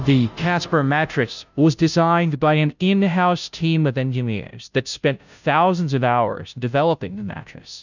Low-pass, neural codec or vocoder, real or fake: 7.2 kHz; codec, 16 kHz, 0.5 kbps, FunCodec, trained on Chinese and English, 25 frames a second; fake